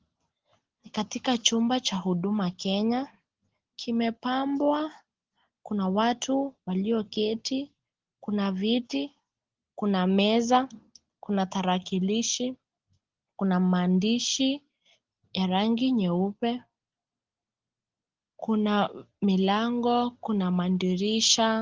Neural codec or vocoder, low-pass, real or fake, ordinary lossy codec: none; 7.2 kHz; real; Opus, 16 kbps